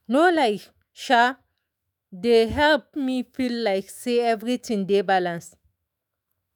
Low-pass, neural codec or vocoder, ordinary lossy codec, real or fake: none; autoencoder, 48 kHz, 128 numbers a frame, DAC-VAE, trained on Japanese speech; none; fake